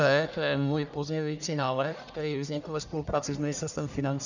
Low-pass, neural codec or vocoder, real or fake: 7.2 kHz; codec, 44.1 kHz, 1.7 kbps, Pupu-Codec; fake